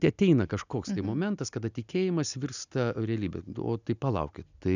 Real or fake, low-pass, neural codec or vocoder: real; 7.2 kHz; none